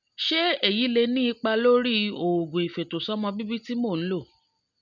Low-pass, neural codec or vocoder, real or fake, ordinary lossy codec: 7.2 kHz; none; real; none